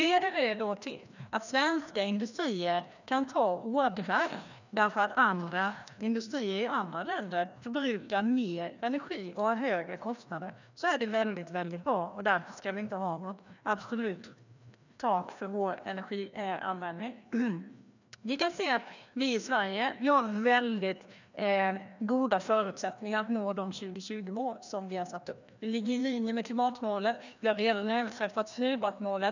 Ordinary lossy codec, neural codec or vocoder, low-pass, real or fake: none; codec, 16 kHz, 1 kbps, FreqCodec, larger model; 7.2 kHz; fake